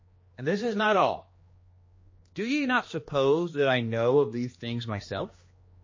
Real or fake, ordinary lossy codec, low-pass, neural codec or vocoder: fake; MP3, 32 kbps; 7.2 kHz; codec, 16 kHz, 2 kbps, X-Codec, HuBERT features, trained on general audio